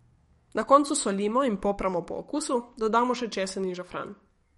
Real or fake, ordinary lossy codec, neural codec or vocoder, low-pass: fake; MP3, 48 kbps; codec, 44.1 kHz, 7.8 kbps, DAC; 19.8 kHz